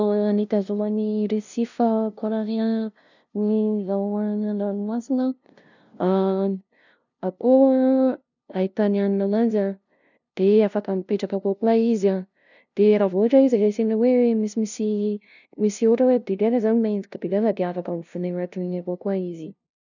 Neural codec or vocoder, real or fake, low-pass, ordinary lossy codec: codec, 16 kHz, 0.5 kbps, FunCodec, trained on LibriTTS, 25 frames a second; fake; 7.2 kHz; none